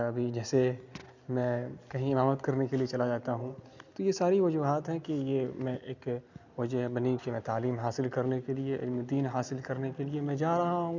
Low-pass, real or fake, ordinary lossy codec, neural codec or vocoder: 7.2 kHz; real; none; none